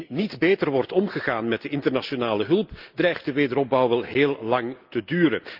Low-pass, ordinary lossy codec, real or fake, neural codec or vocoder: 5.4 kHz; Opus, 32 kbps; real; none